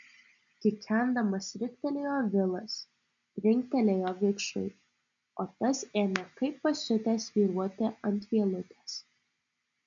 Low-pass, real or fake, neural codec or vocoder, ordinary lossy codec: 7.2 kHz; real; none; MP3, 64 kbps